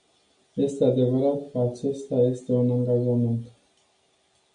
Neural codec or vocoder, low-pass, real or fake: none; 9.9 kHz; real